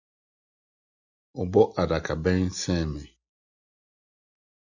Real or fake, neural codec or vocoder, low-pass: real; none; 7.2 kHz